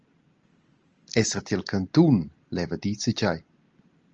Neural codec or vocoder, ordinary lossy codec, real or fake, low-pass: none; Opus, 24 kbps; real; 7.2 kHz